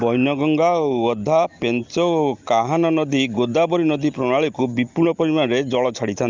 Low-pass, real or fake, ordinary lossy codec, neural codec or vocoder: 7.2 kHz; real; Opus, 32 kbps; none